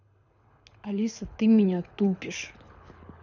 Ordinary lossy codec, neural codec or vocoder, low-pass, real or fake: none; codec, 24 kHz, 6 kbps, HILCodec; 7.2 kHz; fake